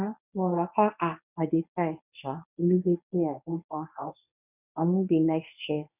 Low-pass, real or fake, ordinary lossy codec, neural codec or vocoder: 3.6 kHz; fake; none; codec, 24 kHz, 0.9 kbps, WavTokenizer, medium speech release version 1